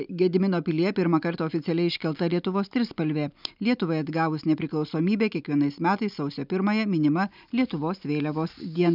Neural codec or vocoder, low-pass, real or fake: none; 5.4 kHz; real